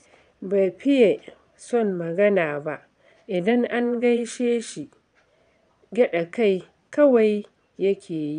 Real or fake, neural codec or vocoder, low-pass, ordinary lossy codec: fake; vocoder, 22.05 kHz, 80 mel bands, Vocos; 9.9 kHz; none